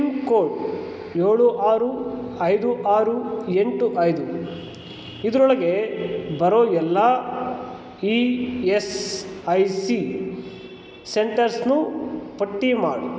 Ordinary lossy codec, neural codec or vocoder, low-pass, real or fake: none; none; none; real